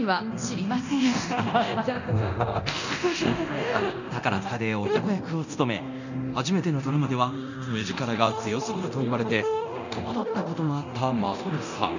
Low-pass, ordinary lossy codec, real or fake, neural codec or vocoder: 7.2 kHz; none; fake; codec, 24 kHz, 0.9 kbps, DualCodec